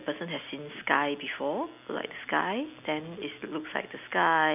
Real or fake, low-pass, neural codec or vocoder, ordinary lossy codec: real; 3.6 kHz; none; none